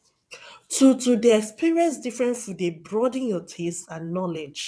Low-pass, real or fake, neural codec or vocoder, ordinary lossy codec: none; fake; vocoder, 22.05 kHz, 80 mel bands, WaveNeXt; none